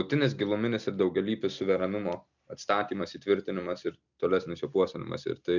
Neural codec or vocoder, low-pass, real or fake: none; 7.2 kHz; real